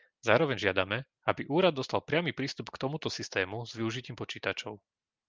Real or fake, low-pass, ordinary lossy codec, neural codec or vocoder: real; 7.2 kHz; Opus, 32 kbps; none